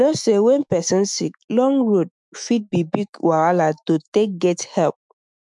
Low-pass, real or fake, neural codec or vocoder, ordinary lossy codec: 10.8 kHz; fake; autoencoder, 48 kHz, 128 numbers a frame, DAC-VAE, trained on Japanese speech; none